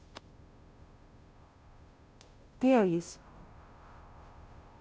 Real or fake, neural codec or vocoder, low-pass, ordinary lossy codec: fake; codec, 16 kHz, 0.5 kbps, FunCodec, trained on Chinese and English, 25 frames a second; none; none